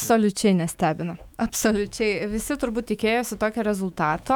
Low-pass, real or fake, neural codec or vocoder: 19.8 kHz; fake; autoencoder, 48 kHz, 128 numbers a frame, DAC-VAE, trained on Japanese speech